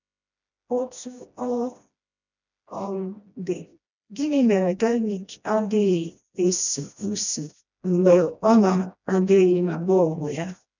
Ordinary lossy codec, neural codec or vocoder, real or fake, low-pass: none; codec, 16 kHz, 1 kbps, FreqCodec, smaller model; fake; 7.2 kHz